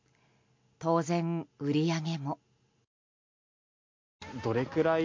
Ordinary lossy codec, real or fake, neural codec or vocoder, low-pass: MP3, 48 kbps; real; none; 7.2 kHz